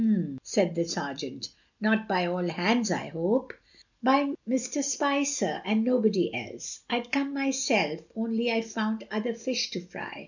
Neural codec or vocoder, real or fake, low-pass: none; real; 7.2 kHz